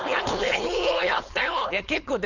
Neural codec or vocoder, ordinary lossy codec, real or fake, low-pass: codec, 16 kHz, 4.8 kbps, FACodec; none; fake; 7.2 kHz